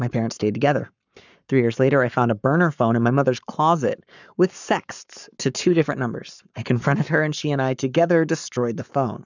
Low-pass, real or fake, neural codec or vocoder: 7.2 kHz; fake; codec, 44.1 kHz, 7.8 kbps, Pupu-Codec